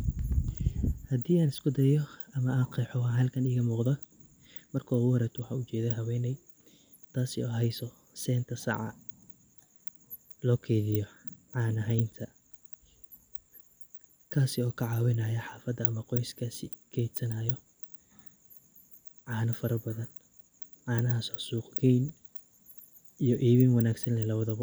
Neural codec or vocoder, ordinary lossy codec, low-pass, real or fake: none; none; none; real